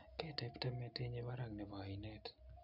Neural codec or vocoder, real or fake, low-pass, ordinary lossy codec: none; real; 5.4 kHz; none